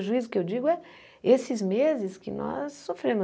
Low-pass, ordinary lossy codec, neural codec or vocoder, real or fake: none; none; none; real